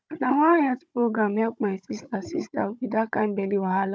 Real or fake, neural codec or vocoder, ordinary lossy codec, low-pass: fake; codec, 16 kHz, 16 kbps, FunCodec, trained on Chinese and English, 50 frames a second; none; none